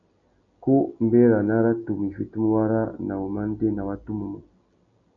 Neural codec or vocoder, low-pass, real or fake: none; 7.2 kHz; real